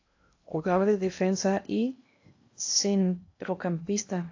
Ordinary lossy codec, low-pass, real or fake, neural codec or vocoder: AAC, 48 kbps; 7.2 kHz; fake; codec, 16 kHz in and 24 kHz out, 0.8 kbps, FocalCodec, streaming, 65536 codes